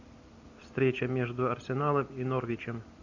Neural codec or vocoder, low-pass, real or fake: none; 7.2 kHz; real